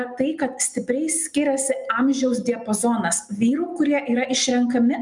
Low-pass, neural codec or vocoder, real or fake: 10.8 kHz; none; real